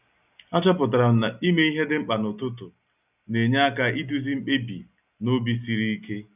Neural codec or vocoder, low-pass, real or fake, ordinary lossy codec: none; 3.6 kHz; real; none